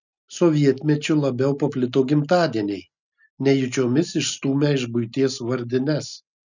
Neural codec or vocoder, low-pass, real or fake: none; 7.2 kHz; real